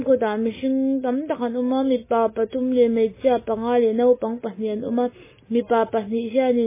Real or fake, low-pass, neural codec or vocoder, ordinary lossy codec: real; 3.6 kHz; none; MP3, 16 kbps